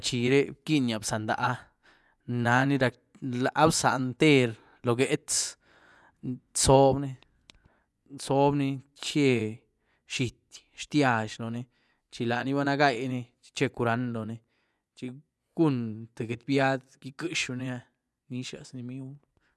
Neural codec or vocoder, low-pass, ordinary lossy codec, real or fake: vocoder, 24 kHz, 100 mel bands, Vocos; none; none; fake